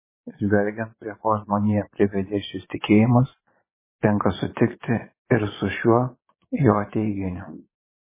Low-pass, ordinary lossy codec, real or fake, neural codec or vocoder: 3.6 kHz; MP3, 16 kbps; fake; vocoder, 22.05 kHz, 80 mel bands, Vocos